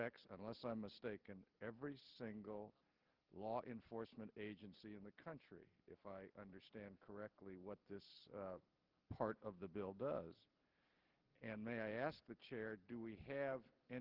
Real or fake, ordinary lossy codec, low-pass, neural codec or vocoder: real; Opus, 16 kbps; 5.4 kHz; none